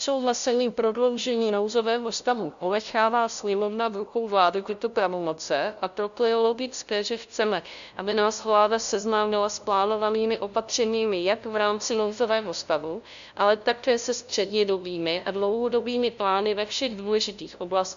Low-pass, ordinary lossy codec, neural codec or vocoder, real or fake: 7.2 kHz; MP3, 64 kbps; codec, 16 kHz, 0.5 kbps, FunCodec, trained on LibriTTS, 25 frames a second; fake